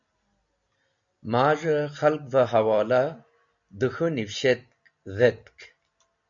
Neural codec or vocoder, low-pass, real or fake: none; 7.2 kHz; real